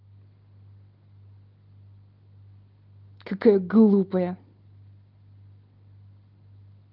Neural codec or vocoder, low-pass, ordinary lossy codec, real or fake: none; 5.4 kHz; Opus, 32 kbps; real